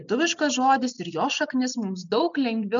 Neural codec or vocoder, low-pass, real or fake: none; 7.2 kHz; real